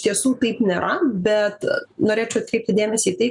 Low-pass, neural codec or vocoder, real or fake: 10.8 kHz; none; real